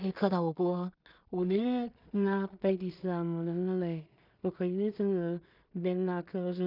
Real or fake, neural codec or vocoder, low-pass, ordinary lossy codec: fake; codec, 16 kHz in and 24 kHz out, 0.4 kbps, LongCat-Audio-Codec, two codebook decoder; 5.4 kHz; none